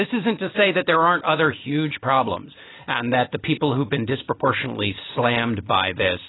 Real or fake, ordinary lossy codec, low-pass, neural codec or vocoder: real; AAC, 16 kbps; 7.2 kHz; none